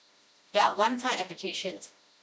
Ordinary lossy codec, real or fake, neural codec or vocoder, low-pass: none; fake; codec, 16 kHz, 1 kbps, FreqCodec, smaller model; none